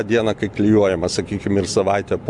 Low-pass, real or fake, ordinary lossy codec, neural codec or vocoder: 10.8 kHz; real; Opus, 64 kbps; none